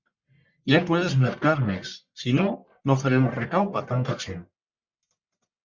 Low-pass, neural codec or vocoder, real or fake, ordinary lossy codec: 7.2 kHz; codec, 44.1 kHz, 1.7 kbps, Pupu-Codec; fake; Opus, 64 kbps